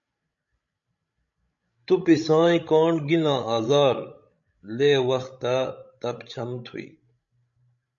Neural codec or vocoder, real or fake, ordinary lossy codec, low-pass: codec, 16 kHz, 16 kbps, FreqCodec, larger model; fake; MP3, 48 kbps; 7.2 kHz